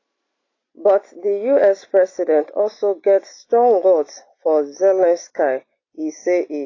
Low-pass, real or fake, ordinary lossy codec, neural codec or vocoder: 7.2 kHz; real; AAC, 32 kbps; none